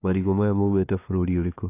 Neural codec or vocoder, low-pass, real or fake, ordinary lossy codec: codec, 16 kHz, 2 kbps, FunCodec, trained on LibriTTS, 25 frames a second; 3.6 kHz; fake; AAC, 24 kbps